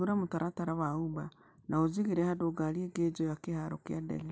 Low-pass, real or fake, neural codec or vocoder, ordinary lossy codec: none; real; none; none